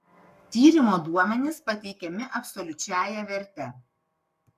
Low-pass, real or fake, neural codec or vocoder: 14.4 kHz; fake; codec, 44.1 kHz, 7.8 kbps, Pupu-Codec